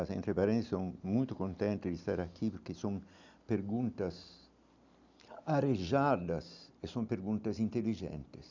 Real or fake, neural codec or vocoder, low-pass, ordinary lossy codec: real; none; 7.2 kHz; none